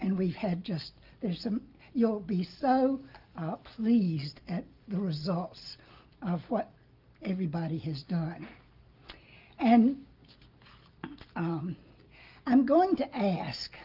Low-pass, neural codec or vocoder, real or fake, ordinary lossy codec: 5.4 kHz; none; real; Opus, 32 kbps